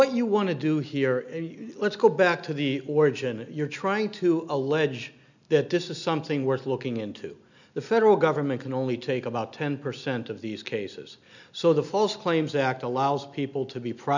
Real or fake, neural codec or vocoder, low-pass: real; none; 7.2 kHz